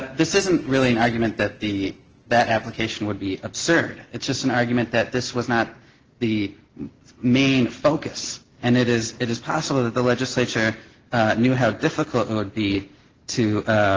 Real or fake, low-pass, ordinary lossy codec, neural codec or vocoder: real; 7.2 kHz; Opus, 16 kbps; none